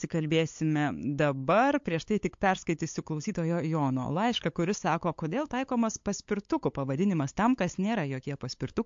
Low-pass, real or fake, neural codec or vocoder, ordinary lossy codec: 7.2 kHz; fake; codec, 16 kHz, 8 kbps, FunCodec, trained on LibriTTS, 25 frames a second; MP3, 48 kbps